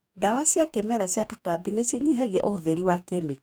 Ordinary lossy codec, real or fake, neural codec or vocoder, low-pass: none; fake; codec, 44.1 kHz, 2.6 kbps, DAC; none